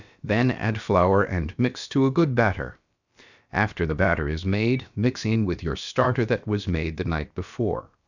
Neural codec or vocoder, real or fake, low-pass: codec, 16 kHz, about 1 kbps, DyCAST, with the encoder's durations; fake; 7.2 kHz